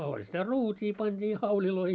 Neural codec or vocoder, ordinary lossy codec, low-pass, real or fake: none; none; none; real